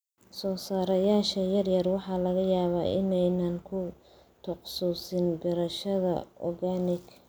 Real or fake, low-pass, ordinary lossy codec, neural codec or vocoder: real; none; none; none